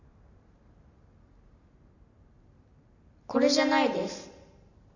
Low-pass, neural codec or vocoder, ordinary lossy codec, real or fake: 7.2 kHz; none; none; real